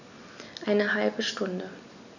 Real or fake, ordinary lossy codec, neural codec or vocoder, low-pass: real; none; none; 7.2 kHz